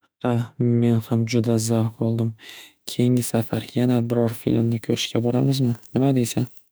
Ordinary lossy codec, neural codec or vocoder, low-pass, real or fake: none; autoencoder, 48 kHz, 32 numbers a frame, DAC-VAE, trained on Japanese speech; none; fake